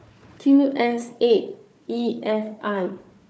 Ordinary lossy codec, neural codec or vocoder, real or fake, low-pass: none; codec, 16 kHz, 4 kbps, FunCodec, trained on Chinese and English, 50 frames a second; fake; none